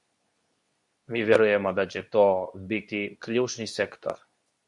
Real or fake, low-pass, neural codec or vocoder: fake; 10.8 kHz; codec, 24 kHz, 0.9 kbps, WavTokenizer, medium speech release version 2